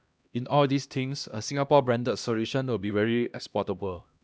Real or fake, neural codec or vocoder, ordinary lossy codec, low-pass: fake; codec, 16 kHz, 1 kbps, X-Codec, HuBERT features, trained on LibriSpeech; none; none